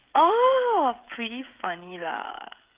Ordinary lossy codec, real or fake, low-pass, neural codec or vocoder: Opus, 24 kbps; fake; 3.6 kHz; codec, 16 kHz, 16 kbps, FreqCodec, smaller model